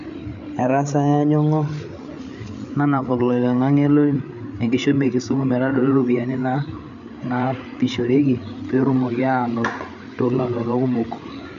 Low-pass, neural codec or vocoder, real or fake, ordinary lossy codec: 7.2 kHz; codec, 16 kHz, 8 kbps, FreqCodec, larger model; fake; none